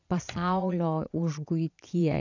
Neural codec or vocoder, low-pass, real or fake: vocoder, 22.05 kHz, 80 mel bands, Vocos; 7.2 kHz; fake